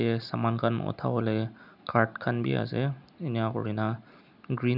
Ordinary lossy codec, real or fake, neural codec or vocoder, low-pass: none; real; none; 5.4 kHz